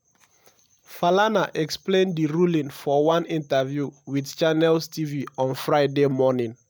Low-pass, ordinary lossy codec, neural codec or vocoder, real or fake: none; none; none; real